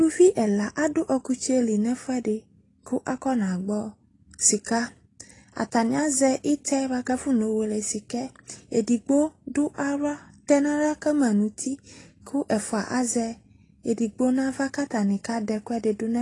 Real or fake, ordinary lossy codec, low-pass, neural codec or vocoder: real; AAC, 32 kbps; 10.8 kHz; none